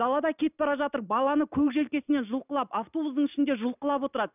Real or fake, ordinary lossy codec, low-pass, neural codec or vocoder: real; none; 3.6 kHz; none